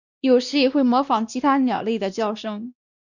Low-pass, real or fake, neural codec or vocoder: 7.2 kHz; fake; codec, 16 kHz, 1 kbps, X-Codec, WavLM features, trained on Multilingual LibriSpeech